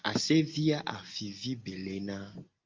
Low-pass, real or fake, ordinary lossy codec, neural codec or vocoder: 7.2 kHz; real; Opus, 32 kbps; none